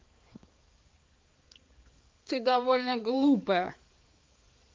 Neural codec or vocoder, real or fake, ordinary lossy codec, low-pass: codec, 16 kHz, 4 kbps, X-Codec, HuBERT features, trained on balanced general audio; fake; Opus, 24 kbps; 7.2 kHz